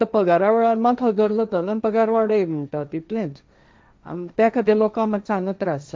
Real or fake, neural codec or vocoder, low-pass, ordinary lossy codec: fake; codec, 16 kHz, 1.1 kbps, Voila-Tokenizer; none; none